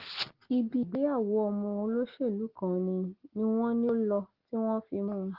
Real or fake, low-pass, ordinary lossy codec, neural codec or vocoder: real; 5.4 kHz; Opus, 16 kbps; none